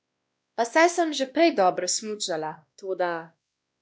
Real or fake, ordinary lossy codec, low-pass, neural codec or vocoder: fake; none; none; codec, 16 kHz, 1 kbps, X-Codec, WavLM features, trained on Multilingual LibriSpeech